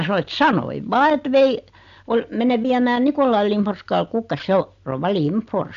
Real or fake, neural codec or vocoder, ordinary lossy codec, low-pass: real; none; MP3, 64 kbps; 7.2 kHz